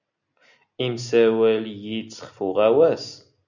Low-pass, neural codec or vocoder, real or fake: 7.2 kHz; none; real